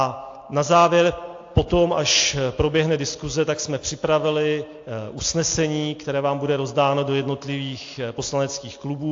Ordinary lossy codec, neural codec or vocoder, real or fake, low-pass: AAC, 48 kbps; none; real; 7.2 kHz